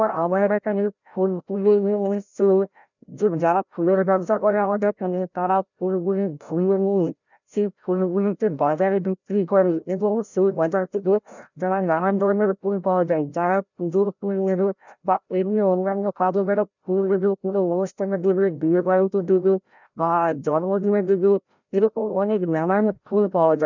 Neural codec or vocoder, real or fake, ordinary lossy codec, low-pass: codec, 16 kHz, 0.5 kbps, FreqCodec, larger model; fake; none; 7.2 kHz